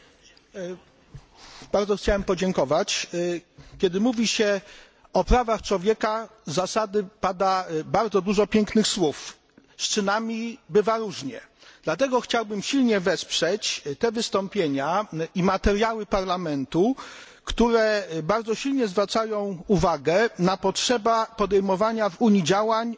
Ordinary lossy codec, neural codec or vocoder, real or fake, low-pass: none; none; real; none